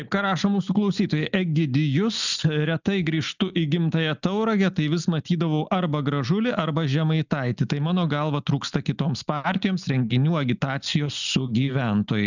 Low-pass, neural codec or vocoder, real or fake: 7.2 kHz; none; real